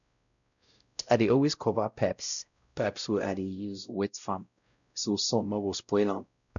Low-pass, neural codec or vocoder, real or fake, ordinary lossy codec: 7.2 kHz; codec, 16 kHz, 0.5 kbps, X-Codec, WavLM features, trained on Multilingual LibriSpeech; fake; none